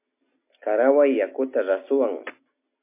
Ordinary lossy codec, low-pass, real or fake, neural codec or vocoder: MP3, 24 kbps; 3.6 kHz; real; none